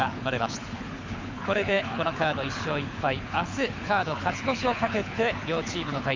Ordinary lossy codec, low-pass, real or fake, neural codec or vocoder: MP3, 64 kbps; 7.2 kHz; fake; codec, 24 kHz, 6 kbps, HILCodec